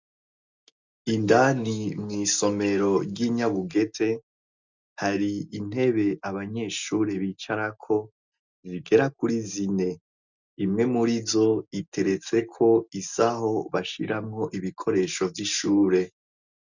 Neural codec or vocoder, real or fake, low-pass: none; real; 7.2 kHz